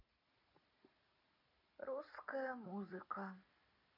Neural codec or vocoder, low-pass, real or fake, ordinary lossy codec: none; 5.4 kHz; real; MP3, 32 kbps